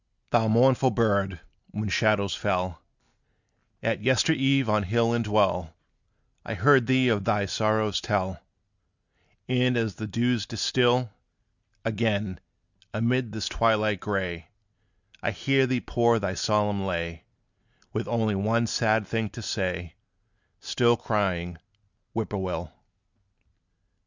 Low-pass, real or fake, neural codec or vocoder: 7.2 kHz; real; none